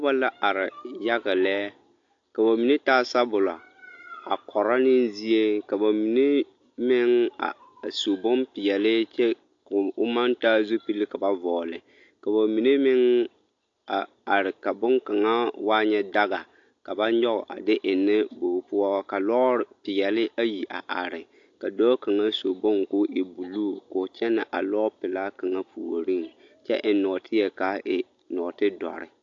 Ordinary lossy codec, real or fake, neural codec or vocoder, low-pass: AAC, 48 kbps; real; none; 7.2 kHz